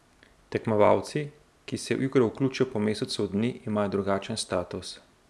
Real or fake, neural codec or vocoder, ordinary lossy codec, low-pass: real; none; none; none